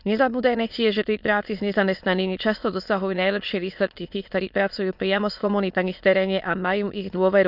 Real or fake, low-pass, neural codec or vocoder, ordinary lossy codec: fake; 5.4 kHz; autoencoder, 22.05 kHz, a latent of 192 numbers a frame, VITS, trained on many speakers; none